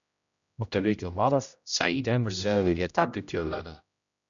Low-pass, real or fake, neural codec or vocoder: 7.2 kHz; fake; codec, 16 kHz, 0.5 kbps, X-Codec, HuBERT features, trained on general audio